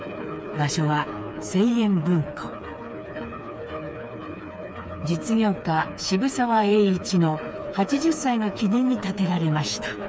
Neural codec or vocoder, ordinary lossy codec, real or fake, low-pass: codec, 16 kHz, 4 kbps, FreqCodec, smaller model; none; fake; none